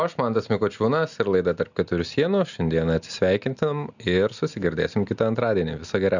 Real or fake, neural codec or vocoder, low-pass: real; none; 7.2 kHz